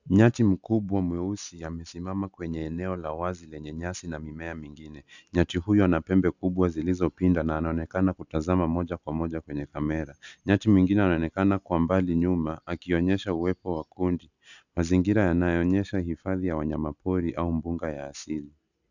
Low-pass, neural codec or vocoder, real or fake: 7.2 kHz; none; real